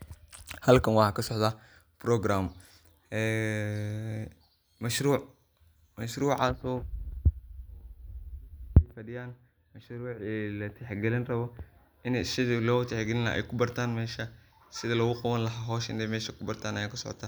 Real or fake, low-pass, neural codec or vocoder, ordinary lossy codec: real; none; none; none